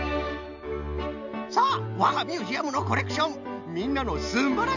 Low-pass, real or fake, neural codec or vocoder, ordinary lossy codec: 7.2 kHz; real; none; none